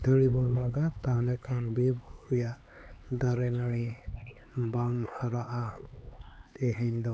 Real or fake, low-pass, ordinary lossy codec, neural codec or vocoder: fake; none; none; codec, 16 kHz, 4 kbps, X-Codec, HuBERT features, trained on LibriSpeech